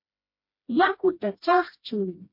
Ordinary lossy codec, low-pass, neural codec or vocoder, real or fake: MP3, 32 kbps; 5.4 kHz; codec, 16 kHz, 1 kbps, FreqCodec, smaller model; fake